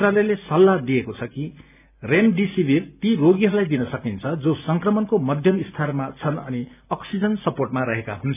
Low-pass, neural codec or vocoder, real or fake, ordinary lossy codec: 3.6 kHz; none; real; none